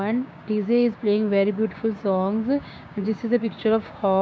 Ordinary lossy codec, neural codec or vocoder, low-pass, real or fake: none; codec, 16 kHz, 6 kbps, DAC; none; fake